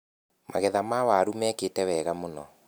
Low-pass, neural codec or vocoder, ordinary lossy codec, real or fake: none; none; none; real